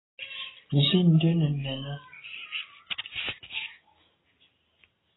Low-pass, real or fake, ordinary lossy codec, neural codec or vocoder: 7.2 kHz; real; AAC, 16 kbps; none